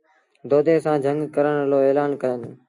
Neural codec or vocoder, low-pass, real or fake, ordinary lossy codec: none; 10.8 kHz; real; AAC, 64 kbps